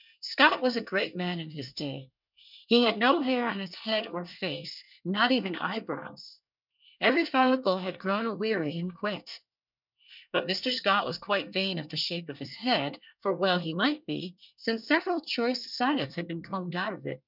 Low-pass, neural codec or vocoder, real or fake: 5.4 kHz; codec, 24 kHz, 1 kbps, SNAC; fake